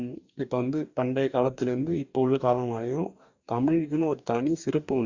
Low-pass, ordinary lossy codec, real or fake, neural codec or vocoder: 7.2 kHz; Opus, 64 kbps; fake; codec, 44.1 kHz, 2.6 kbps, DAC